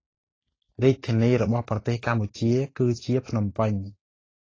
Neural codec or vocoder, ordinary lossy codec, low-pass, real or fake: none; AAC, 32 kbps; 7.2 kHz; real